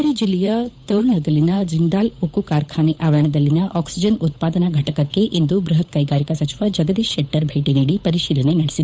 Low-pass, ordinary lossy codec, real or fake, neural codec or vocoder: none; none; fake; codec, 16 kHz, 8 kbps, FunCodec, trained on Chinese and English, 25 frames a second